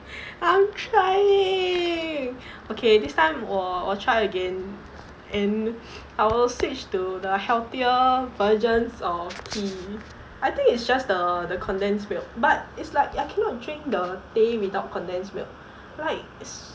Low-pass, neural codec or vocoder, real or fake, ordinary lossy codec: none; none; real; none